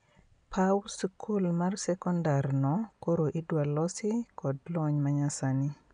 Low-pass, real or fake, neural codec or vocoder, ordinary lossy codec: 9.9 kHz; real; none; none